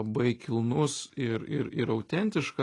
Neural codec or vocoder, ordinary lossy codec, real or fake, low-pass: none; AAC, 32 kbps; real; 10.8 kHz